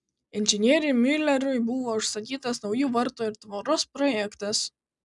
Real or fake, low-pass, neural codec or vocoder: real; 10.8 kHz; none